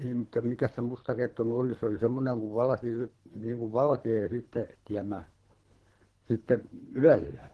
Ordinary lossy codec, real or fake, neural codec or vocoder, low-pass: Opus, 16 kbps; fake; codec, 24 kHz, 3 kbps, HILCodec; 10.8 kHz